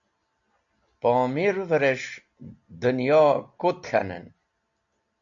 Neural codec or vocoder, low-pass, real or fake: none; 7.2 kHz; real